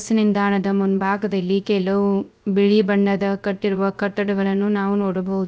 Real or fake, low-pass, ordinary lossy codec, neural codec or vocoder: fake; none; none; codec, 16 kHz, 0.3 kbps, FocalCodec